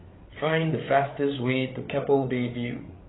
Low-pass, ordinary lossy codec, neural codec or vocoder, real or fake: 7.2 kHz; AAC, 16 kbps; codec, 16 kHz, 8 kbps, FreqCodec, larger model; fake